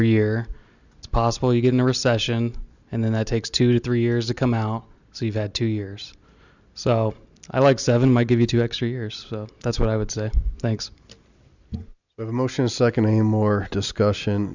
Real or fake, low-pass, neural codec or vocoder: real; 7.2 kHz; none